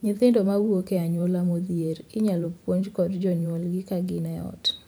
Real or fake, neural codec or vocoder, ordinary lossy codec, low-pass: fake; vocoder, 44.1 kHz, 128 mel bands every 512 samples, BigVGAN v2; none; none